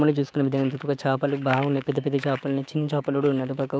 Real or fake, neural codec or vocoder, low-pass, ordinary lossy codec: fake; codec, 16 kHz, 6 kbps, DAC; none; none